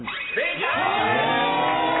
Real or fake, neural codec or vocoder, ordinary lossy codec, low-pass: real; none; AAC, 16 kbps; 7.2 kHz